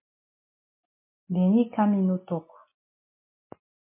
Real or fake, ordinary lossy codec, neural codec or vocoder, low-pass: real; MP3, 24 kbps; none; 3.6 kHz